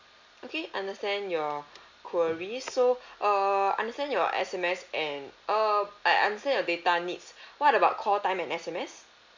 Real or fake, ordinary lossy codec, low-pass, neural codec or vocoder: real; MP3, 64 kbps; 7.2 kHz; none